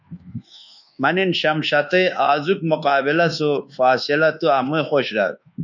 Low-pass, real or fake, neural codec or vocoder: 7.2 kHz; fake; codec, 24 kHz, 1.2 kbps, DualCodec